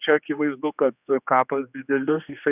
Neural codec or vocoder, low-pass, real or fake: codec, 16 kHz, 2 kbps, X-Codec, HuBERT features, trained on general audio; 3.6 kHz; fake